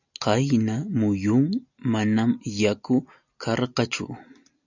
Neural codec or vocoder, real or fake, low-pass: none; real; 7.2 kHz